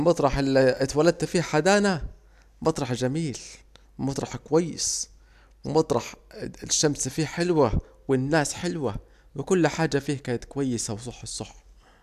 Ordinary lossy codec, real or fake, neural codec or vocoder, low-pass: none; real; none; 14.4 kHz